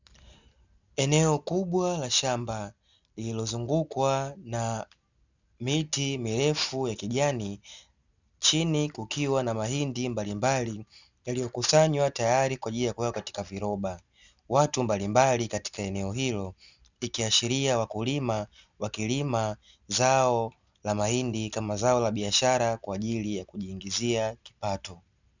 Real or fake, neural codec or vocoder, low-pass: real; none; 7.2 kHz